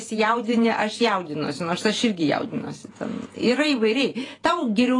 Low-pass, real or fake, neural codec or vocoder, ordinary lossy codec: 10.8 kHz; fake; vocoder, 48 kHz, 128 mel bands, Vocos; AAC, 32 kbps